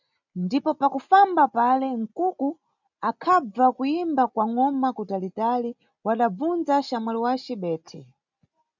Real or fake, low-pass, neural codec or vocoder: real; 7.2 kHz; none